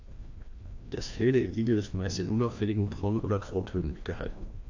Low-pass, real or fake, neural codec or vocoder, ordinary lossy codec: 7.2 kHz; fake; codec, 16 kHz, 1 kbps, FreqCodec, larger model; AAC, 48 kbps